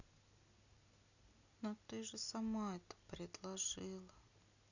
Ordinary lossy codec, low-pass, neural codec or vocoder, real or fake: none; 7.2 kHz; none; real